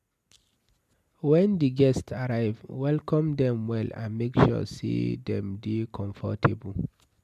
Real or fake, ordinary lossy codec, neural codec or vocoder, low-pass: real; MP3, 96 kbps; none; 14.4 kHz